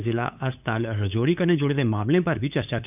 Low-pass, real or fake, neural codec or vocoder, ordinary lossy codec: 3.6 kHz; fake; codec, 16 kHz, 8 kbps, FunCodec, trained on LibriTTS, 25 frames a second; none